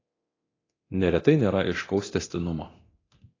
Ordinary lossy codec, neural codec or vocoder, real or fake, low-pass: AAC, 32 kbps; codec, 24 kHz, 0.9 kbps, DualCodec; fake; 7.2 kHz